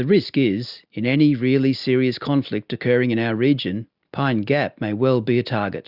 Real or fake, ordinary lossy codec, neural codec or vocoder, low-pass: real; Opus, 64 kbps; none; 5.4 kHz